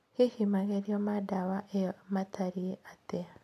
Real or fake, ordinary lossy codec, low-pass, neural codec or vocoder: real; none; 14.4 kHz; none